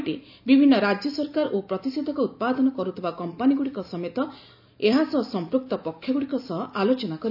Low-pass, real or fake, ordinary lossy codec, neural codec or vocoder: 5.4 kHz; real; none; none